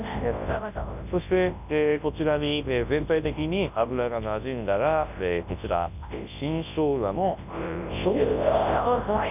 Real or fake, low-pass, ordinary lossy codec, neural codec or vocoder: fake; 3.6 kHz; none; codec, 24 kHz, 0.9 kbps, WavTokenizer, large speech release